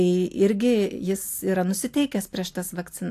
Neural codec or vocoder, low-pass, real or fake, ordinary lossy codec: none; 14.4 kHz; real; AAC, 64 kbps